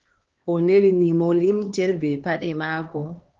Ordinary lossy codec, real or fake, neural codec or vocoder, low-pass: Opus, 16 kbps; fake; codec, 16 kHz, 2 kbps, X-Codec, HuBERT features, trained on LibriSpeech; 7.2 kHz